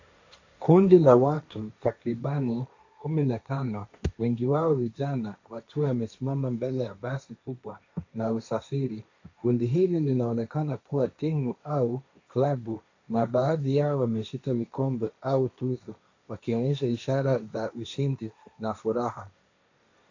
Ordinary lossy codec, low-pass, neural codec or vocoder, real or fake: AAC, 48 kbps; 7.2 kHz; codec, 16 kHz, 1.1 kbps, Voila-Tokenizer; fake